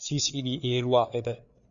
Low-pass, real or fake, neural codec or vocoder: 7.2 kHz; fake; codec, 16 kHz, 4 kbps, FreqCodec, larger model